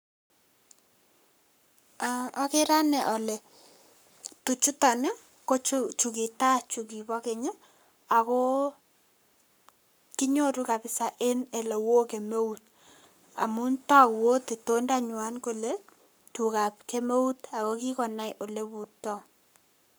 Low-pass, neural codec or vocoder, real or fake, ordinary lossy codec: none; codec, 44.1 kHz, 7.8 kbps, Pupu-Codec; fake; none